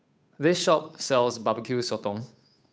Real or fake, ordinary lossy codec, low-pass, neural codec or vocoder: fake; none; none; codec, 16 kHz, 8 kbps, FunCodec, trained on Chinese and English, 25 frames a second